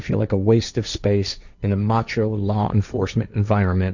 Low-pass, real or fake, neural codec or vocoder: 7.2 kHz; fake; codec, 16 kHz, 1.1 kbps, Voila-Tokenizer